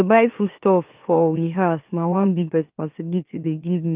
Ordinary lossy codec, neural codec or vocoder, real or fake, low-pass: Opus, 24 kbps; autoencoder, 44.1 kHz, a latent of 192 numbers a frame, MeloTTS; fake; 3.6 kHz